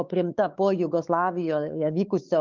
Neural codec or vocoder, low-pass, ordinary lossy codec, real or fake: codec, 16 kHz, 4 kbps, X-Codec, WavLM features, trained on Multilingual LibriSpeech; 7.2 kHz; Opus, 32 kbps; fake